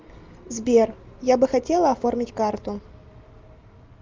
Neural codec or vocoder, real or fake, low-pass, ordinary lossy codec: none; real; 7.2 kHz; Opus, 24 kbps